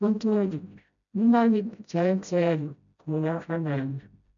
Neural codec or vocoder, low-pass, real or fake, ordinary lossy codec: codec, 16 kHz, 0.5 kbps, FreqCodec, smaller model; 7.2 kHz; fake; none